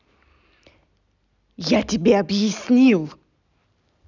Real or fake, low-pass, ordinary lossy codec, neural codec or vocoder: real; 7.2 kHz; none; none